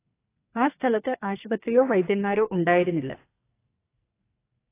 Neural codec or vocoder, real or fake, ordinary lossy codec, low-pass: codec, 44.1 kHz, 2.6 kbps, SNAC; fake; AAC, 24 kbps; 3.6 kHz